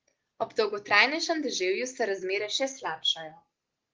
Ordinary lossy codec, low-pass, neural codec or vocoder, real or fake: Opus, 16 kbps; 7.2 kHz; none; real